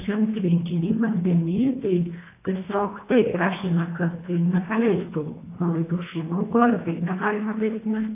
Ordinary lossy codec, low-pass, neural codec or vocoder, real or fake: AAC, 24 kbps; 3.6 kHz; codec, 24 kHz, 1.5 kbps, HILCodec; fake